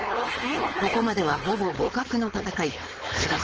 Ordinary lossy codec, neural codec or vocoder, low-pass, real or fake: Opus, 16 kbps; codec, 16 kHz, 4.8 kbps, FACodec; 7.2 kHz; fake